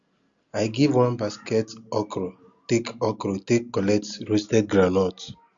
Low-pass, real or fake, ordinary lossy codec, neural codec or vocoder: 7.2 kHz; real; Opus, 64 kbps; none